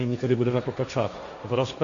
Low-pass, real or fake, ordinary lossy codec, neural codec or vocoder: 7.2 kHz; fake; Opus, 64 kbps; codec, 16 kHz, 1.1 kbps, Voila-Tokenizer